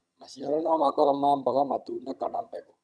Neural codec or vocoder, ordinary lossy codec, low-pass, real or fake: vocoder, 22.05 kHz, 80 mel bands, HiFi-GAN; none; none; fake